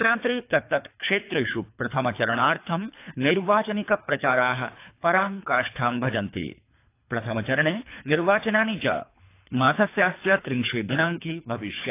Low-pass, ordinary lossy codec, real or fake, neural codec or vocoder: 3.6 kHz; AAC, 24 kbps; fake; codec, 24 kHz, 3 kbps, HILCodec